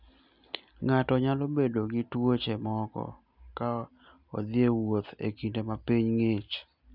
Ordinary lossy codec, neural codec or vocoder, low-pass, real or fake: none; none; 5.4 kHz; real